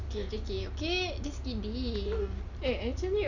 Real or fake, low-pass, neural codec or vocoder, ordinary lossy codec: real; 7.2 kHz; none; none